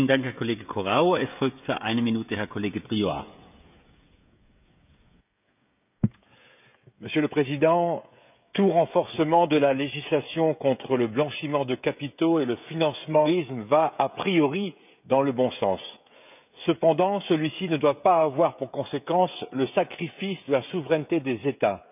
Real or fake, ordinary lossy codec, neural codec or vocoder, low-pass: fake; none; codec, 16 kHz, 16 kbps, FreqCodec, smaller model; 3.6 kHz